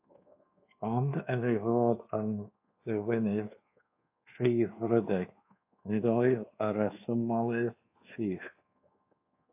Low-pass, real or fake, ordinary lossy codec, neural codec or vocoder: 3.6 kHz; fake; AAC, 32 kbps; codec, 24 kHz, 1.2 kbps, DualCodec